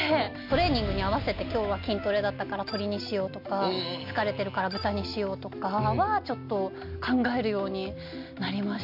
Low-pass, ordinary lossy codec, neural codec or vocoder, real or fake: 5.4 kHz; MP3, 48 kbps; none; real